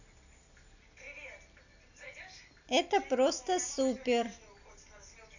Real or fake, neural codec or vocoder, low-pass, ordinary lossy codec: real; none; 7.2 kHz; none